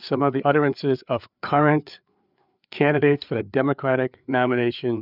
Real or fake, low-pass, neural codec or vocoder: fake; 5.4 kHz; codec, 16 kHz, 4 kbps, FreqCodec, larger model